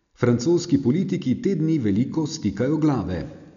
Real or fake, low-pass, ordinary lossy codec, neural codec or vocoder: real; 7.2 kHz; none; none